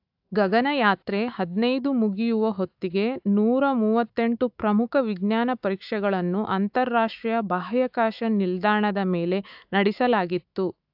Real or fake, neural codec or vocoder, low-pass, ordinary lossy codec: fake; autoencoder, 48 kHz, 128 numbers a frame, DAC-VAE, trained on Japanese speech; 5.4 kHz; AAC, 48 kbps